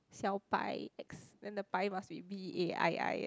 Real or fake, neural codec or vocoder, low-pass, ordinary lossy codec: real; none; none; none